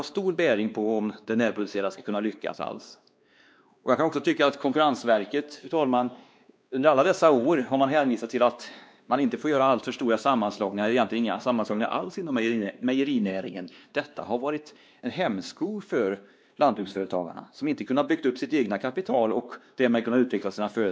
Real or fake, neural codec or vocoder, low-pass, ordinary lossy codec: fake; codec, 16 kHz, 2 kbps, X-Codec, WavLM features, trained on Multilingual LibriSpeech; none; none